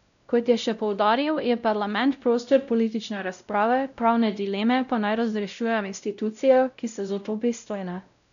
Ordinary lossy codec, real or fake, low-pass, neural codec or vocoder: none; fake; 7.2 kHz; codec, 16 kHz, 0.5 kbps, X-Codec, WavLM features, trained on Multilingual LibriSpeech